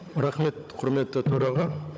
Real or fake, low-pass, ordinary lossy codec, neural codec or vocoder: fake; none; none; codec, 16 kHz, 16 kbps, FunCodec, trained on Chinese and English, 50 frames a second